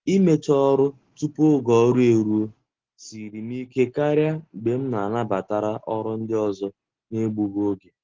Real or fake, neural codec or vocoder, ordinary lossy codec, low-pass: real; none; Opus, 16 kbps; 7.2 kHz